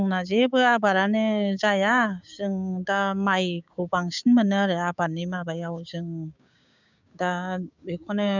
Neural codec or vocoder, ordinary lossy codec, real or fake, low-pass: codec, 16 kHz, 6 kbps, DAC; none; fake; 7.2 kHz